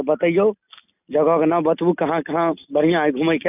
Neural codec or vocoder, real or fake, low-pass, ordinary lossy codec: none; real; 3.6 kHz; none